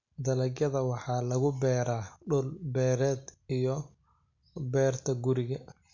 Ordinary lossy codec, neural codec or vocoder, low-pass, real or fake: MP3, 48 kbps; none; 7.2 kHz; real